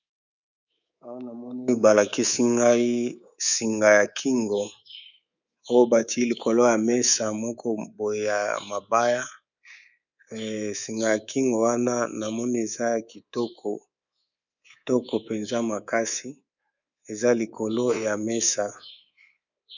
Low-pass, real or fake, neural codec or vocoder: 7.2 kHz; fake; codec, 24 kHz, 3.1 kbps, DualCodec